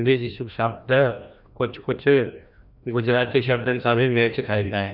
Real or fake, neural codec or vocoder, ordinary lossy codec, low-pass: fake; codec, 16 kHz, 1 kbps, FreqCodec, larger model; none; 5.4 kHz